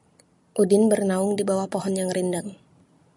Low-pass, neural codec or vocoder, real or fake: 10.8 kHz; none; real